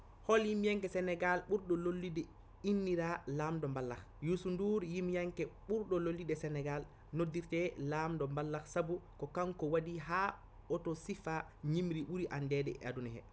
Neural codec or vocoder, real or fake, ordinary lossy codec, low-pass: none; real; none; none